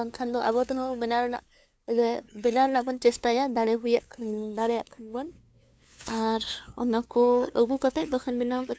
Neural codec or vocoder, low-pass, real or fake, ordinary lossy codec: codec, 16 kHz, 2 kbps, FunCodec, trained on LibriTTS, 25 frames a second; none; fake; none